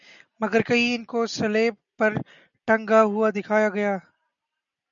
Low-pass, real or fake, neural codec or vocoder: 7.2 kHz; real; none